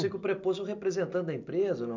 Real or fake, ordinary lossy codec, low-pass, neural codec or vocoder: real; MP3, 64 kbps; 7.2 kHz; none